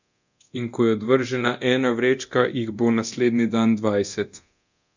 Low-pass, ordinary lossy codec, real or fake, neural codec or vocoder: 7.2 kHz; none; fake; codec, 24 kHz, 0.9 kbps, DualCodec